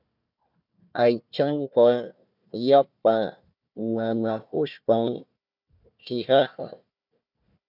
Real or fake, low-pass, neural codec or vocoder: fake; 5.4 kHz; codec, 16 kHz, 1 kbps, FunCodec, trained on Chinese and English, 50 frames a second